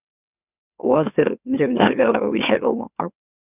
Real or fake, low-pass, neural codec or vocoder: fake; 3.6 kHz; autoencoder, 44.1 kHz, a latent of 192 numbers a frame, MeloTTS